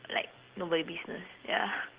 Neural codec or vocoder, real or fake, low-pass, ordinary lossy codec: none; real; 3.6 kHz; Opus, 16 kbps